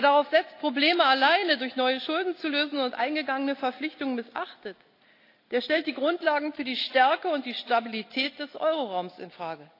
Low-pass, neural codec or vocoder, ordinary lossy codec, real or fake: 5.4 kHz; none; AAC, 32 kbps; real